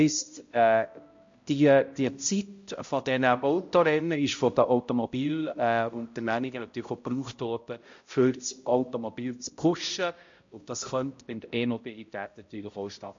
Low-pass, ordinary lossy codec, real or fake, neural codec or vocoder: 7.2 kHz; MP3, 48 kbps; fake; codec, 16 kHz, 0.5 kbps, X-Codec, HuBERT features, trained on balanced general audio